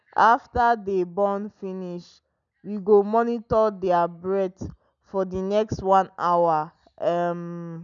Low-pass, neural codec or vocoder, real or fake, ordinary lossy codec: 7.2 kHz; none; real; none